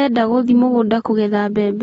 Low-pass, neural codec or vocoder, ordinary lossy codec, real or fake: 10.8 kHz; none; AAC, 24 kbps; real